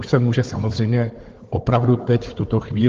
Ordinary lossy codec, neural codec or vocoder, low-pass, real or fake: Opus, 16 kbps; codec, 16 kHz, 4 kbps, FunCodec, trained on Chinese and English, 50 frames a second; 7.2 kHz; fake